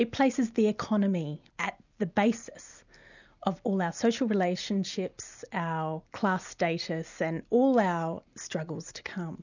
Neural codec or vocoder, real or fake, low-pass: none; real; 7.2 kHz